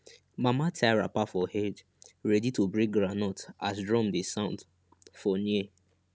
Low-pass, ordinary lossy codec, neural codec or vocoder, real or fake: none; none; none; real